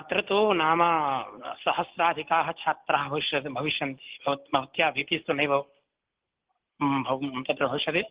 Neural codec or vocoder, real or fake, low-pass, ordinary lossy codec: none; real; 3.6 kHz; Opus, 16 kbps